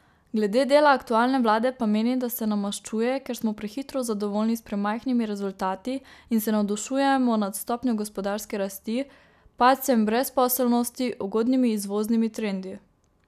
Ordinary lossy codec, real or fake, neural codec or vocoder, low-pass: none; real; none; 14.4 kHz